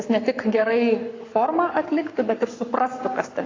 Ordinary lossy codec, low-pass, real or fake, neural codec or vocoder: AAC, 32 kbps; 7.2 kHz; fake; codec, 44.1 kHz, 7.8 kbps, Pupu-Codec